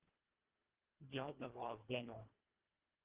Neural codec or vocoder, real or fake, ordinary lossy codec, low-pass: codec, 24 kHz, 1.5 kbps, HILCodec; fake; Opus, 16 kbps; 3.6 kHz